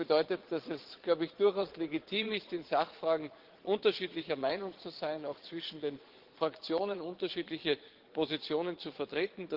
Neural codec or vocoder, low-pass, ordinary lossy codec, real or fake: vocoder, 22.05 kHz, 80 mel bands, Vocos; 5.4 kHz; Opus, 16 kbps; fake